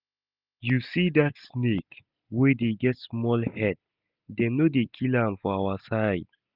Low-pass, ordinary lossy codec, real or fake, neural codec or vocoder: 5.4 kHz; none; real; none